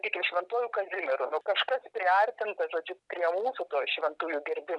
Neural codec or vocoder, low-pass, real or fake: none; 10.8 kHz; real